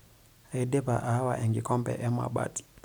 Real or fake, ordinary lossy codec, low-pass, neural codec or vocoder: real; none; none; none